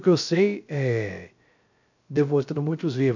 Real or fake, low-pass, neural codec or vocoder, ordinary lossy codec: fake; 7.2 kHz; codec, 16 kHz, 0.3 kbps, FocalCodec; none